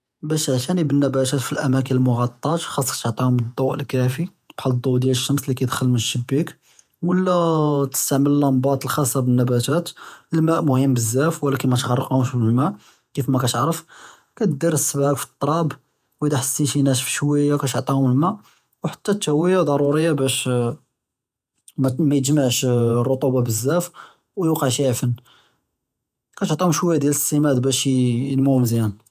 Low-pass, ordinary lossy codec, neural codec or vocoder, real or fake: 14.4 kHz; none; vocoder, 48 kHz, 128 mel bands, Vocos; fake